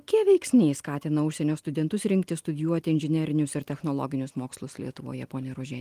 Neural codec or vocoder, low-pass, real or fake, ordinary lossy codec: none; 14.4 kHz; real; Opus, 24 kbps